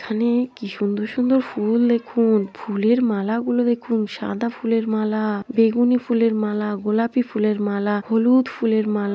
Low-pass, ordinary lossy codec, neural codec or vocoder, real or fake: none; none; none; real